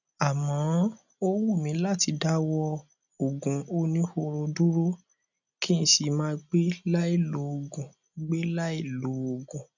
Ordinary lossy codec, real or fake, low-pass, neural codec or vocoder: none; real; 7.2 kHz; none